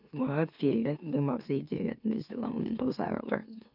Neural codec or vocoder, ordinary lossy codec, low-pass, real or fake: autoencoder, 44.1 kHz, a latent of 192 numbers a frame, MeloTTS; none; 5.4 kHz; fake